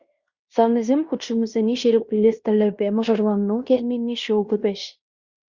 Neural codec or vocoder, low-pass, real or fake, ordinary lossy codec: codec, 16 kHz in and 24 kHz out, 0.9 kbps, LongCat-Audio-Codec, fine tuned four codebook decoder; 7.2 kHz; fake; Opus, 64 kbps